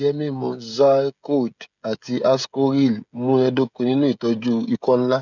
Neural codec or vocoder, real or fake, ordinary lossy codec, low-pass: codec, 16 kHz, 16 kbps, FreqCodec, smaller model; fake; none; 7.2 kHz